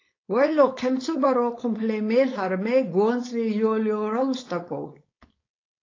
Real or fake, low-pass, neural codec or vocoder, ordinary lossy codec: fake; 7.2 kHz; codec, 16 kHz, 4.8 kbps, FACodec; AAC, 32 kbps